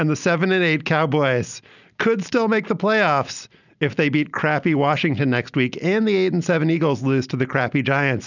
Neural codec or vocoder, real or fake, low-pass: none; real; 7.2 kHz